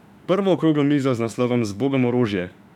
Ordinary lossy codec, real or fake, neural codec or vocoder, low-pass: none; fake; autoencoder, 48 kHz, 32 numbers a frame, DAC-VAE, trained on Japanese speech; 19.8 kHz